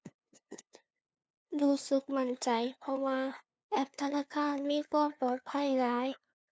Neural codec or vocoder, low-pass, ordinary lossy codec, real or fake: codec, 16 kHz, 2 kbps, FunCodec, trained on LibriTTS, 25 frames a second; none; none; fake